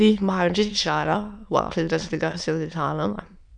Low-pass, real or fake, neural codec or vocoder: 9.9 kHz; fake; autoencoder, 22.05 kHz, a latent of 192 numbers a frame, VITS, trained on many speakers